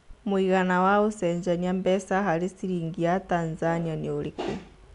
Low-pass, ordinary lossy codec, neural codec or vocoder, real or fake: 10.8 kHz; none; none; real